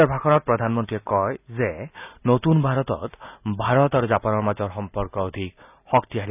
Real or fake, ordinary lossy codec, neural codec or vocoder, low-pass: real; none; none; 3.6 kHz